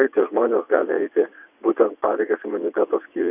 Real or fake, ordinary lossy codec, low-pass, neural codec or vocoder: fake; AAC, 32 kbps; 3.6 kHz; vocoder, 22.05 kHz, 80 mel bands, WaveNeXt